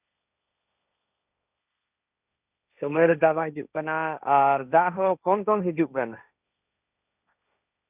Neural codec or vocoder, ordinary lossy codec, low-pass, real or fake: codec, 16 kHz, 1.1 kbps, Voila-Tokenizer; none; 3.6 kHz; fake